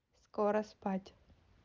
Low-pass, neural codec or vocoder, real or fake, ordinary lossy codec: 7.2 kHz; none; real; Opus, 32 kbps